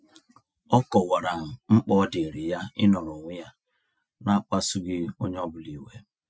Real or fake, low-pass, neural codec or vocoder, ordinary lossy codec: real; none; none; none